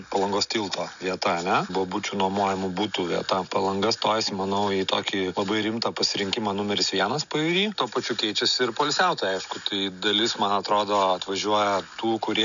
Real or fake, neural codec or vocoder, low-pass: real; none; 7.2 kHz